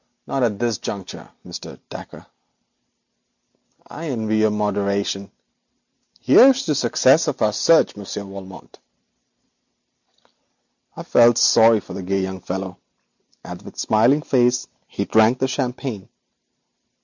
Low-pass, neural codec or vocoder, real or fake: 7.2 kHz; none; real